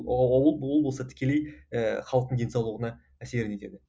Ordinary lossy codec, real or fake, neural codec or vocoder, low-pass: none; real; none; none